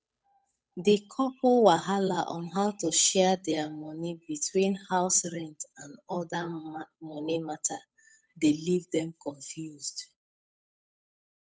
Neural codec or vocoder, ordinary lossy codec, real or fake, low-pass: codec, 16 kHz, 8 kbps, FunCodec, trained on Chinese and English, 25 frames a second; none; fake; none